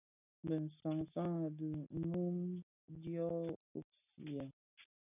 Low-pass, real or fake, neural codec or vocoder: 3.6 kHz; real; none